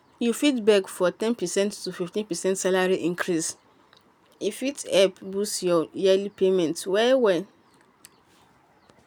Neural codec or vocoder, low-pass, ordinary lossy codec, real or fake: none; none; none; real